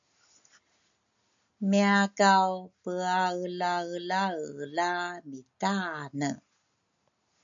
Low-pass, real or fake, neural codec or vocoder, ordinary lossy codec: 7.2 kHz; real; none; MP3, 64 kbps